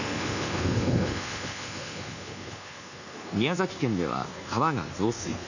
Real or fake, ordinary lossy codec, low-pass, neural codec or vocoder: fake; none; 7.2 kHz; codec, 24 kHz, 1.2 kbps, DualCodec